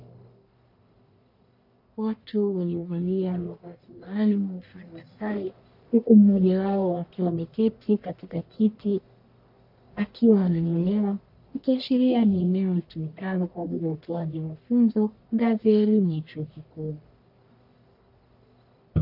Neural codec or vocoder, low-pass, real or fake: codec, 44.1 kHz, 1.7 kbps, Pupu-Codec; 5.4 kHz; fake